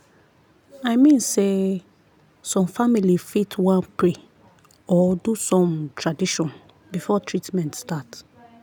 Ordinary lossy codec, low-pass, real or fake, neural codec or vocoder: none; none; real; none